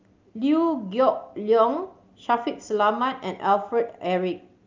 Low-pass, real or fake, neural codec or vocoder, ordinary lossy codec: 7.2 kHz; real; none; Opus, 24 kbps